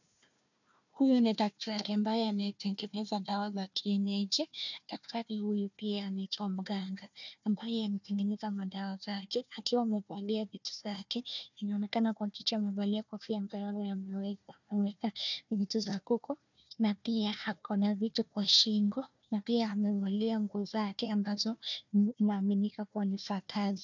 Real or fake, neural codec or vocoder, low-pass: fake; codec, 16 kHz, 1 kbps, FunCodec, trained on Chinese and English, 50 frames a second; 7.2 kHz